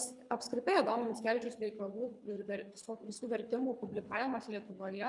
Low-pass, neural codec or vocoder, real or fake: 10.8 kHz; codec, 24 kHz, 3 kbps, HILCodec; fake